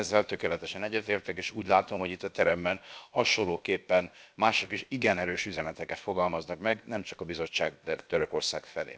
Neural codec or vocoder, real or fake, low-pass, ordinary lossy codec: codec, 16 kHz, about 1 kbps, DyCAST, with the encoder's durations; fake; none; none